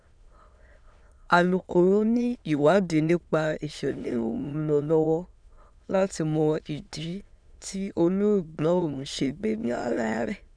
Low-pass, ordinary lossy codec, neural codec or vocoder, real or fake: 9.9 kHz; none; autoencoder, 22.05 kHz, a latent of 192 numbers a frame, VITS, trained on many speakers; fake